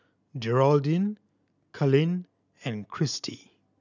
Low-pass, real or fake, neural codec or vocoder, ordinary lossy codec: 7.2 kHz; real; none; none